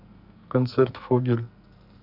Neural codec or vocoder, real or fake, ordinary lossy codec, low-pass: codec, 44.1 kHz, 2.6 kbps, SNAC; fake; none; 5.4 kHz